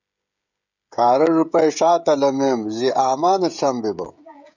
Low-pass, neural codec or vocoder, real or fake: 7.2 kHz; codec, 16 kHz, 16 kbps, FreqCodec, smaller model; fake